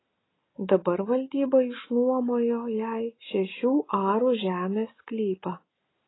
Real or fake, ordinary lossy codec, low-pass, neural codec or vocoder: real; AAC, 16 kbps; 7.2 kHz; none